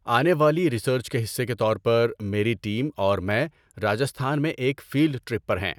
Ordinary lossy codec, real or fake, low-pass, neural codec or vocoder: none; real; 19.8 kHz; none